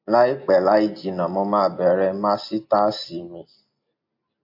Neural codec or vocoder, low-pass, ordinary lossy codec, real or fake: none; 5.4 kHz; MP3, 32 kbps; real